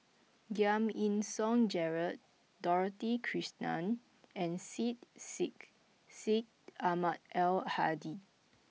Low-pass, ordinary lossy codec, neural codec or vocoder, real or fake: none; none; none; real